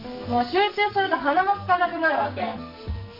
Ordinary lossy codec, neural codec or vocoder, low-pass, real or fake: none; codec, 44.1 kHz, 2.6 kbps, SNAC; 5.4 kHz; fake